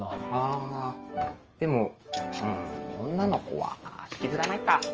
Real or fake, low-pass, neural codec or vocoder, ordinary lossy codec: fake; 7.2 kHz; autoencoder, 48 kHz, 128 numbers a frame, DAC-VAE, trained on Japanese speech; Opus, 24 kbps